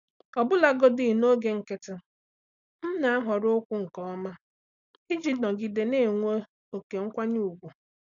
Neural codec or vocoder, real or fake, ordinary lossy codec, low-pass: none; real; none; 7.2 kHz